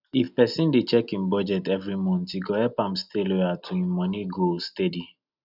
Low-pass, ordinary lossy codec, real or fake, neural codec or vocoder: 5.4 kHz; none; real; none